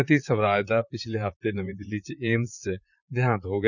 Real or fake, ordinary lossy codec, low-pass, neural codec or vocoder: fake; none; 7.2 kHz; vocoder, 44.1 kHz, 128 mel bands, Pupu-Vocoder